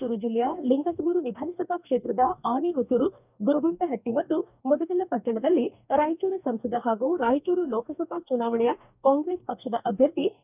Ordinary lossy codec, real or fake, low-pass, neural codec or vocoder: none; fake; 3.6 kHz; codec, 44.1 kHz, 2.6 kbps, DAC